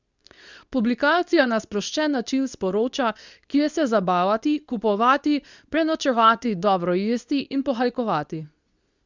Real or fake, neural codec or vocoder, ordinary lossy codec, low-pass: fake; codec, 24 kHz, 0.9 kbps, WavTokenizer, medium speech release version 2; Opus, 64 kbps; 7.2 kHz